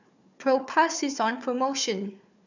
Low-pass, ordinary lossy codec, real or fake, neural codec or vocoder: 7.2 kHz; none; fake; codec, 16 kHz, 4 kbps, FunCodec, trained on Chinese and English, 50 frames a second